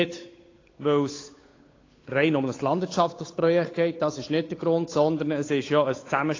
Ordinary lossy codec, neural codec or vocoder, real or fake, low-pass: AAC, 32 kbps; codec, 16 kHz, 8 kbps, FunCodec, trained on Chinese and English, 25 frames a second; fake; 7.2 kHz